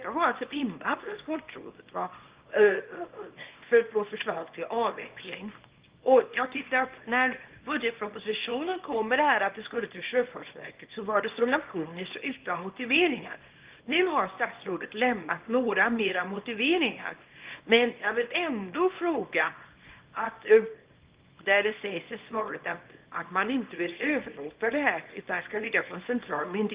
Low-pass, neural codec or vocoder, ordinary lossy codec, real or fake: 3.6 kHz; codec, 24 kHz, 0.9 kbps, WavTokenizer, small release; Opus, 32 kbps; fake